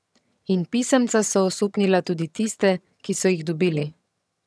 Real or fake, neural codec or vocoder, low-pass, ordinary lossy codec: fake; vocoder, 22.05 kHz, 80 mel bands, HiFi-GAN; none; none